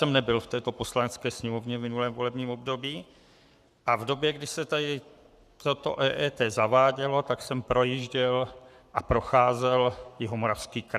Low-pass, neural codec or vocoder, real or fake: 14.4 kHz; codec, 44.1 kHz, 7.8 kbps, Pupu-Codec; fake